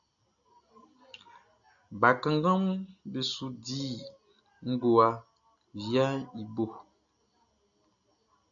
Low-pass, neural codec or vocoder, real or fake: 7.2 kHz; none; real